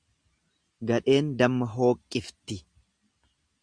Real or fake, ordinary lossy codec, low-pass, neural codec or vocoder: real; Opus, 64 kbps; 9.9 kHz; none